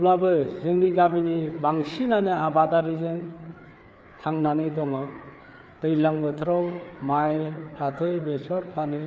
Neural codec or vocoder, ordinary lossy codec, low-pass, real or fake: codec, 16 kHz, 4 kbps, FreqCodec, larger model; none; none; fake